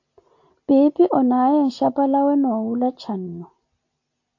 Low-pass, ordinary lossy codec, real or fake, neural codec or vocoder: 7.2 kHz; AAC, 48 kbps; real; none